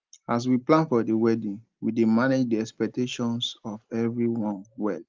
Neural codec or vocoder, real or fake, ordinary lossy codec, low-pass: none; real; Opus, 24 kbps; 7.2 kHz